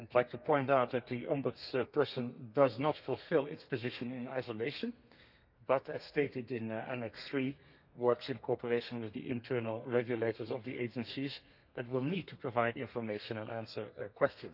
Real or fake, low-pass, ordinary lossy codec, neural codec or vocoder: fake; 5.4 kHz; none; codec, 32 kHz, 1.9 kbps, SNAC